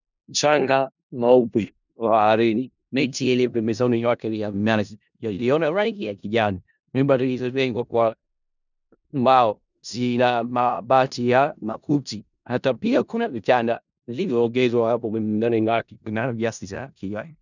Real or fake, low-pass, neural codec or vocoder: fake; 7.2 kHz; codec, 16 kHz in and 24 kHz out, 0.4 kbps, LongCat-Audio-Codec, four codebook decoder